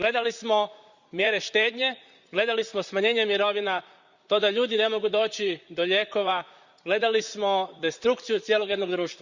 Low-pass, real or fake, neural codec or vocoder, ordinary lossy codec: 7.2 kHz; fake; vocoder, 44.1 kHz, 128 mel bands, Pupu-Vocoder; Opus, 64 kbps